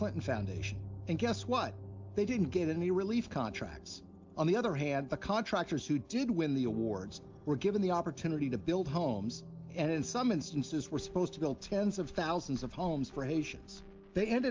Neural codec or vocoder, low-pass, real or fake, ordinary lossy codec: none; 7.2 kHz; real; Opus, 32 kbps